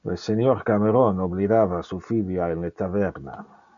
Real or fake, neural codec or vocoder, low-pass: real; none; 7.2 kHz